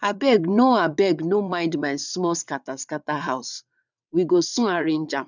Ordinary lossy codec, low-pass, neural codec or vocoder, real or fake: none; 7.2 kHz; vocoder, 22.05 kHz, 80 mel bands, WaveNeXt; fake